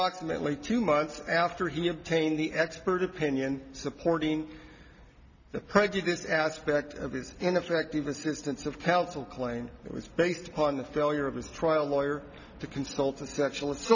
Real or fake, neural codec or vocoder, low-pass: real; none; 7.2 kHz